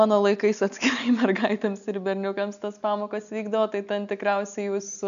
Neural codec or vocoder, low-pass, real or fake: none; 7.2 kHz; real